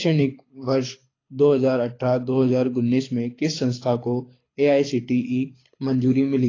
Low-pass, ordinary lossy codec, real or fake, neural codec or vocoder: 7.2 kHz; AAC, 32 kbps; fake; codec, 24 kHz, 6 kbps, HILCodec